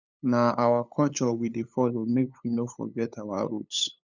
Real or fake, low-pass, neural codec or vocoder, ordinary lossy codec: fake; 7.2 kHz; codec, 16 kHz, 8 kbps, FunCodec, trained on LibriTTS, 25 frames a second; none